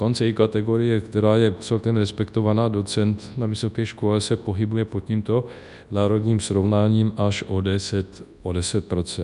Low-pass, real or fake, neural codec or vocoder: 10.8 kHz; fake; codec, 24 kHz, 0.9 kbps, WavTokenizer, large speech release